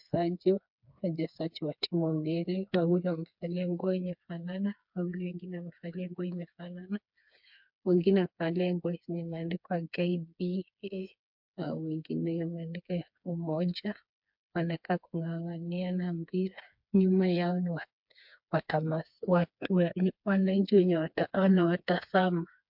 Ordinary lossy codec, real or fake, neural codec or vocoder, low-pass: MP3, 48 kbps; fake; codec, 16 kHz, 4 kbps, FreqCodec, smaller model; 5.4 kHz